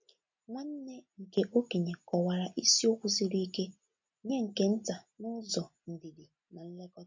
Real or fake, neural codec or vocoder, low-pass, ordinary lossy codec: real; none; 7.2 kHz; MP3, 48 kbps